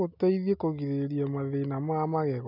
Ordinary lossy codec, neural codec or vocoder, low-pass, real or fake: none; none; 5.4 kHz; real